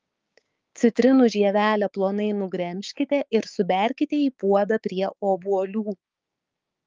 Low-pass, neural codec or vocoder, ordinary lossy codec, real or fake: 7.2 kHz; codec, 16 kHz, 6 kbps, DAC; Opus, 24 kbps; fake